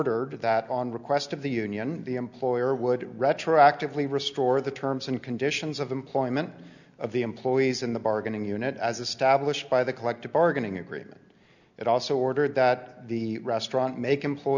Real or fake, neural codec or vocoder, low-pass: real; none; 7.2 kHz